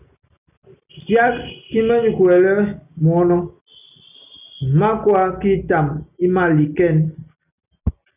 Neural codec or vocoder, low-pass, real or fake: none; 3.6 kHz; real